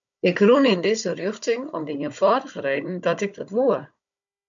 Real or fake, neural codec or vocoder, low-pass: fake; codec, 16 kHz, 16 kbps, FunCodec, trained on Chinese and English, 50 frames a second; 7.2 kHz